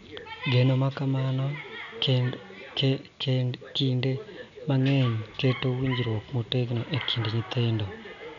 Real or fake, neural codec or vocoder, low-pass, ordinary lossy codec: real; none; 7.2 kHz; none